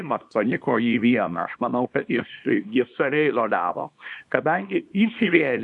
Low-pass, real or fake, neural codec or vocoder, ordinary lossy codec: 10.8 kHz; fake; codec, 24 kHz, 0.9 kbps, WavTokenizer, small release; MP3, 96 kbps